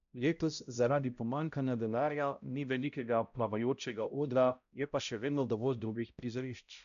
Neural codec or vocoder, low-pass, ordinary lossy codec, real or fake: codec, 16 kHz, 0.5 kbps, X-Codec, HuBERT features, trained on balanced general audio; 7.2 kHz; MP3, 96 kbps; fake